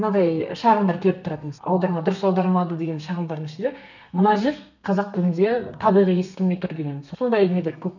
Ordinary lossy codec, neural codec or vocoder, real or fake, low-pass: none; codec, 32 kHz, 1.9 kbps, SNAC; fake; 7.2 kHz